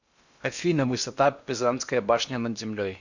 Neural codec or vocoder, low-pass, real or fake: codec, 16 kHz in and 24 kHz out, 0.8 kbps, FocalCodec, streaming, 65536 codes; 7.2 kHz; fake